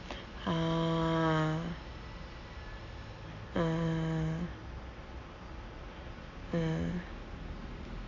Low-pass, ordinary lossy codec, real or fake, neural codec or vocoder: 7.2 kHz; none; real; none